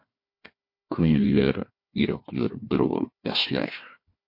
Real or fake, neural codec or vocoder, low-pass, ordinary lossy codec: fake; codec, 16 kHz, 1 kbps, FunCodec, trained on Chinese and English, 50 frames a second; 5.4 kHz; MP3, 32 kbps